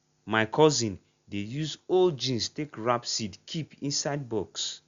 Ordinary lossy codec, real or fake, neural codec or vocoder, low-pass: Opus, 64 kbps; real; none; 7.2 kHz